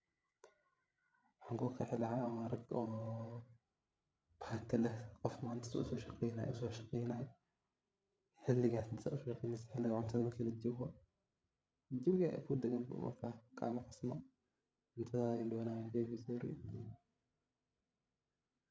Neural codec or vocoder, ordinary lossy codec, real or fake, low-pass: codec, 16 kHz, 8 kbps, FreqCodec, larger model; none; fake; none